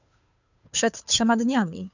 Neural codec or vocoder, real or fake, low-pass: codec, 16 kHz, 2 kbps, FunCodec, trained on Chinese and English, 25 frames a second; fake; 7.2 kHz